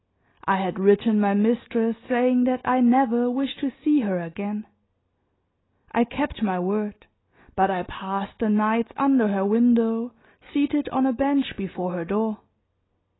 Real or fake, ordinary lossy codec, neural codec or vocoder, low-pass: real; AAC, 16 kbps; none; 7.2 kHz